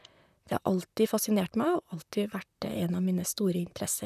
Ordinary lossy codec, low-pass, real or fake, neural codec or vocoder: none; 14.4 kHz; fake; vocoder, 44.1 kHz, 128 mel bands, Pupu-Vocoder